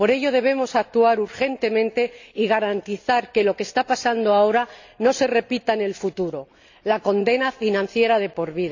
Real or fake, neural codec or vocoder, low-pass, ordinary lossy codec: real; none; 7.2 kHz; AAC, 48 kbps